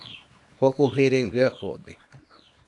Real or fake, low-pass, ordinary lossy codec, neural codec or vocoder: fake; 10.8 kHz; AAC, 64 kbps; codec, 24 kHz, 0.9 kbps, WavTokenizer, small release